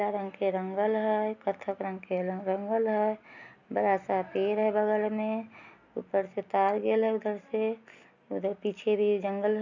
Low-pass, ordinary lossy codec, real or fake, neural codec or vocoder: 7.2 kHz; none; real; none